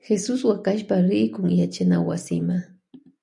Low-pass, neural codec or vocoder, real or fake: 10.8 kHz; none; real